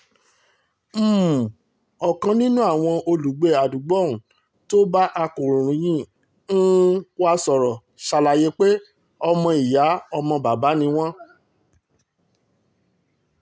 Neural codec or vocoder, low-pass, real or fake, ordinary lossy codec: none; none; real; none